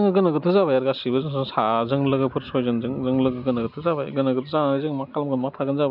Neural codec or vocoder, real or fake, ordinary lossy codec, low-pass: none; real; none; 5.4 kHz